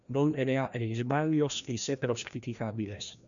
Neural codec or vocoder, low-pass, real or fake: codec, 16 kHz, 1 kbps, FreqCodec, larger model; 7.2 kHz; fake